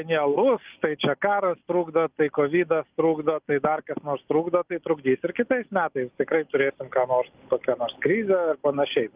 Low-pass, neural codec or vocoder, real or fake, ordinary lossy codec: 3.6 kHz; none; real; Opus, 24 kbps